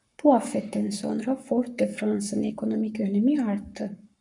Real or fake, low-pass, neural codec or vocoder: fake; 10.8 kHz; codec, 44.1 kHz, 7.8 kbps, Pupu-Codec